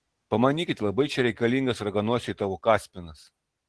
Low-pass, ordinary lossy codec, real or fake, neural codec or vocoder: 10.8 kHz; Opus, 16 kbps; real; none